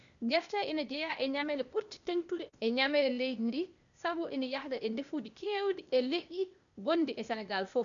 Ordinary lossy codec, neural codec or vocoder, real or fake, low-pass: none; codec, 16 kHz, 0.8 kbps, ZipCodec; fake; 7.2 kHz